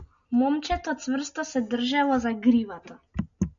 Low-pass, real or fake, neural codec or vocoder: 7.2 kHz; real; none